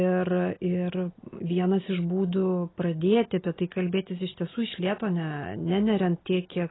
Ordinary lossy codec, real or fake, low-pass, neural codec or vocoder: AAC, 16 kbps; real; 7.2 kHz; none